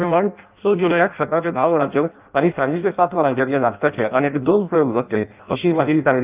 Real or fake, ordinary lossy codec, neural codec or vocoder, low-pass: fake; Opus, 24 kbps; codec, 16 kHz in and 24 kHz out, 0.6 kbps, FireRedTTS-2 codec; 3.6 kHz